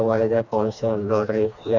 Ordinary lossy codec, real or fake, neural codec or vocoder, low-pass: none; fake; codec, 16 kHz, 2 kbps, FreqCodec, smaller model; 7.2 kHz